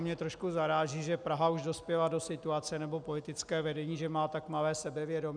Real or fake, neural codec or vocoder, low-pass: real; none; 9.9 kHz